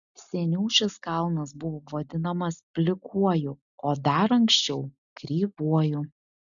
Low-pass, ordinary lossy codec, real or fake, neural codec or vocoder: 7.2 kHz; MP3, 96 kbps; real; none